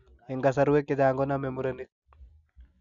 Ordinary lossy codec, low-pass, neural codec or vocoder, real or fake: none; 7.2 kHz; none; real